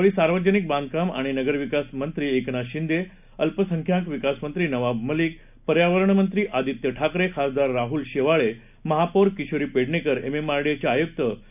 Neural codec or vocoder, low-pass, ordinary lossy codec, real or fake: none; 3.6 kHz; none; real